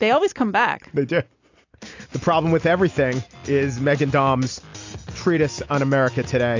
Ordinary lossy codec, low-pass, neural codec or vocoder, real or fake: AAC, 48 kbps; 7.2 kHz; none; real